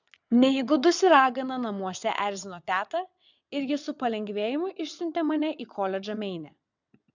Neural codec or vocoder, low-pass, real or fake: vocoder, 22.05 kHz, 80 mel bands, WaveNeXt; 7.2 kHz; fake